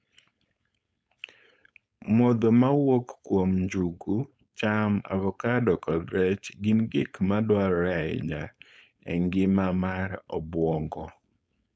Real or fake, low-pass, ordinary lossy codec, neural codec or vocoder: fake; none; none; codec, 16 kHz, 4.8 kbps, FACodec